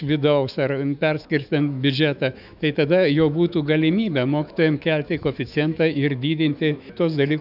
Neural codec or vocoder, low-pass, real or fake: autoencoder, 48 kHz, 128 numbers a frame, DAC-VAE, trained on Japanese speech; 5.4 kHz; fake